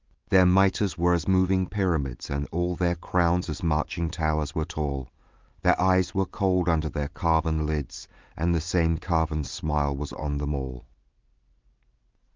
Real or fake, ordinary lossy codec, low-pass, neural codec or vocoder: real; Opus, 32 kbps; 7.2 kHz; none